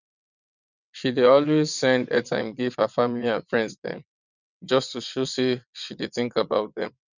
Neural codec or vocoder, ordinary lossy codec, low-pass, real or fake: vocoder, 22.05 kHz, 80 mel bands, WaveNeXt; none; 7.2 kHz; fake